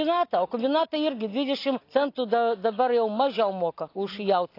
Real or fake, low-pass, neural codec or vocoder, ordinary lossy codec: real; 5.4 kHz; none; AAC, 32 kbps